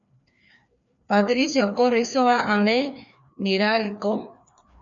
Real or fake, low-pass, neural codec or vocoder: fake; 7.2 kHz; codec, 16 kHz, 2 kbps, FreqCodec, larger model